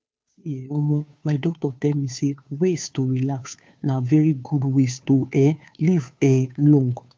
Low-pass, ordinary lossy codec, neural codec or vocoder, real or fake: none; none; codec, 16 kHz, 2 kbps, FunCodec, trained on Chinese and English, 25 frames a second; fake